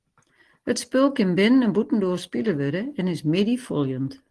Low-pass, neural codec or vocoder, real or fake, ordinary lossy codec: 10.8 kHz; none; real; Opus, 16 kbps